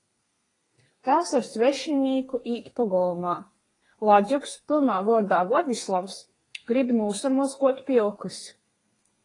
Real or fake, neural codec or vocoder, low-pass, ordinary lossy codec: fake; codec, 32 kHz, 1.9 kbps, SNAC; 10.8 kHz; AAC, 32 kbps